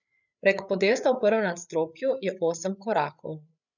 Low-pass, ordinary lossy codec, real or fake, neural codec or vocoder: 7.2 kHz; none; fake; codec, 16 kHz, 8 kbps, FreqCodec, larger model